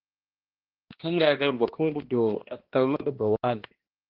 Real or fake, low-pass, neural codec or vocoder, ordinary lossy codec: fake; 5.4 kHz; codec, 16 kHz, 1 kbps, X-Codec, HuBERT features, trained on balanced general audio; Opus, 16 kbps